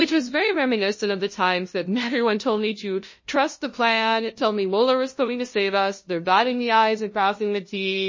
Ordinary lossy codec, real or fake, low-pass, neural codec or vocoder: MP3, 32 kbps; fake; 7.2 kHz; codec, 16 kHz, 0.5 kbps, FunCodec, trained on LibriTTS, 25 frames a second